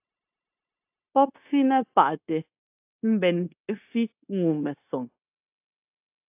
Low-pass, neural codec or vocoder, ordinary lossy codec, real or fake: 3.6 kHz; codec, 16 kHz, 0.9 kbps, LongCat-Audio-Codec; AAC, 32 kbps; fake